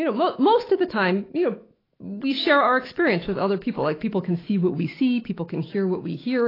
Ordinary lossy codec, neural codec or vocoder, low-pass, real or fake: AAC, 24 kbps; autoencoder, 48 kHz, 128 numbers a frame, DAC-VAE, trained on Japanese speech; 5.4 kHz; fake